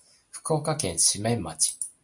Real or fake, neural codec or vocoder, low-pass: real; none; 10.8 kHz